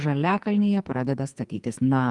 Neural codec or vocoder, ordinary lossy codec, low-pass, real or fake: codec, 44.1 kHz, 2.6 kbps, SNAC; Opus, 32 kbps; 10.8 kHz; fake